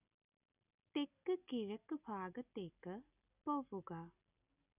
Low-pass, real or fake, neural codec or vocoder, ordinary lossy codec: 3.6 kHz; real; none; none